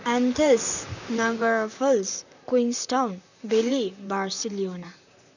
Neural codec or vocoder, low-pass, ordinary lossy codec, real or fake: vocoder, 44.1 kHz, 128 mel bands, Pupu-Vocoder; 7.2 kHz; none; fake